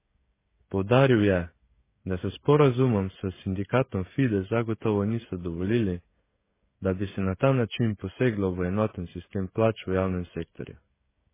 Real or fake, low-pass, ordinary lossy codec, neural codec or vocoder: fake; 3.6 kHz; MP3, 16 kbps; codec, 16 kHz, 16 kbps, FreqCodec, smaller model